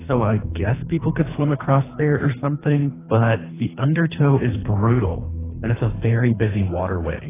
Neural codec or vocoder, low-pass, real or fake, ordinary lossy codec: codec, 24 kHz, 3 kbps, HILCodec; 3.6 kHz; fake; AAC, 16 kbps